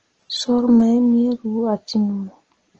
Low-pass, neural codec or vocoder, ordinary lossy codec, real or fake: 7.2 kHz; none; Opus, 16 kbps; real